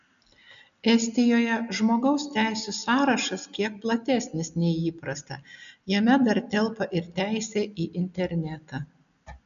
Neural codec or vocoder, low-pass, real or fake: none; 7.2 kHz; real